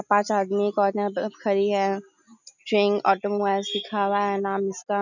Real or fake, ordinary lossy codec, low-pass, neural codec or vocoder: real; none; 7.2 kHz; none